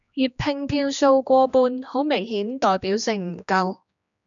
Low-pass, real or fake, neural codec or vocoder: 7.2 kHz; fake; codec, 16 kHz, 2 kbps, X-Codec, HuBERT features, trained on general audio